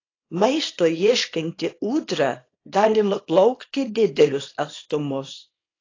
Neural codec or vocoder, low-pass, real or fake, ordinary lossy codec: codec, 24 kHz, 0.9 kbps, WavTokenizer, small release; 7.2 kHz; fake; AAC, 32 kbps